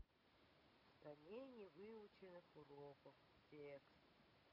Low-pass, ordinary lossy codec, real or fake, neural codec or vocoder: 5.4 kHz; none; real; none